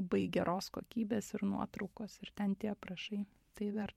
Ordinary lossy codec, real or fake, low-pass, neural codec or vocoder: MP3, 64 kbps; fake; 19.8 kHz; vocoder, 44.1 kHz, 128 mel bands every 512 samples, BigVGAN v2